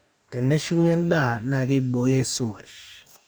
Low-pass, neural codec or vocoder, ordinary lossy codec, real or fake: none; codec, 44.1 kHz, 2.6 kbps, DAC; none; fake